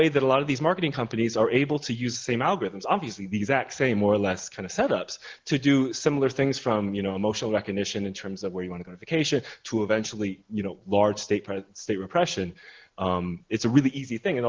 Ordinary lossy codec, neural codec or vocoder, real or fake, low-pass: Opus, 16 kbps; none; real; 7.2 kHz